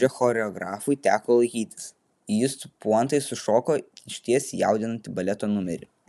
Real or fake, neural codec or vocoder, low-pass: real; none; 14.4 kHz